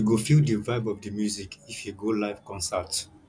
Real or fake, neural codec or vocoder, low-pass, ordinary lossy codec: real; none; 9.9 kHz; none